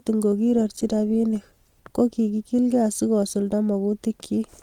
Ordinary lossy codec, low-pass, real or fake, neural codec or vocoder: Opus, 24 kbps; 19.8 kHz; real; none